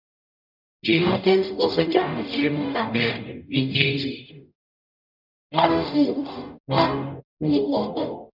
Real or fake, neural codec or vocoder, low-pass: fake; codec, 44.1 kHz, 0.9 kbps, DAC; 5.4 kHz